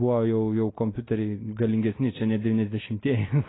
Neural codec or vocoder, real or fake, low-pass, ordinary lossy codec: none; real; 7.2 kHz; AAC, 16 kbps